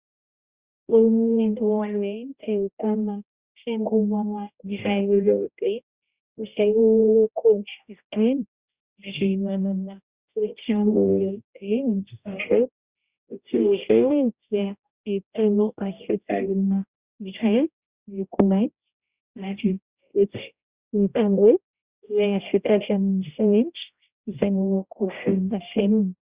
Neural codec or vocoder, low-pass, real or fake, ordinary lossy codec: codec, 16 kHz, 0.5 kbps, X-Codec, HuBERT features, trained on general audio; 3.6 kHz; fake; Opus, 64 kbps